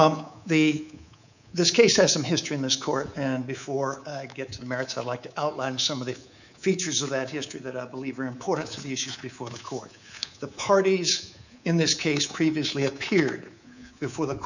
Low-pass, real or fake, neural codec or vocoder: 7.2 kHz; fake; codec, 24 kHz, 3.1 kbps, DualCodec